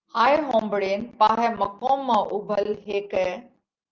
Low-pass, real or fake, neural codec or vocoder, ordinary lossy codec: 7.2 kHz; real; none; Opus, 24 kbps